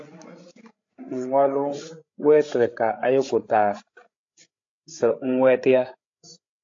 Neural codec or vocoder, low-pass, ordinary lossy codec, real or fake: codec, 16 kHz, 16 kbps, FreqCodec, larger model; 7.2 kHz; AAC, 48 kbps; fake